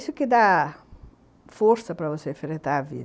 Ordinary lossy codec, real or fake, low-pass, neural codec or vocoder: none; real; none; none